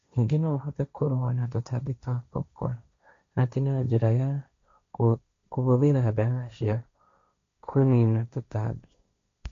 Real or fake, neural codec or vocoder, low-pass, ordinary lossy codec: fake; codec, 16 kHz, 1.1 kbps, Voila-Tokenizer; 7.2 kHz; MP3, 48 kbps